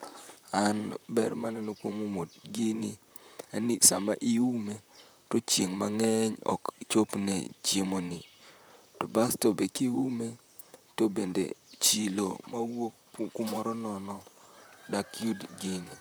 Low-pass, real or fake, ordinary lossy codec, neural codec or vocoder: none; fake; none; vocoder, 44.1 kHz, 128 mel bands, Pupu-Vocoder